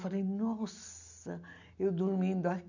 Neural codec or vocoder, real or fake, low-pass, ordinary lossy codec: none; real; 7.2 kHz; none